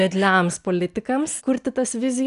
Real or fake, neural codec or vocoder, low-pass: real; none; 10.8 kHz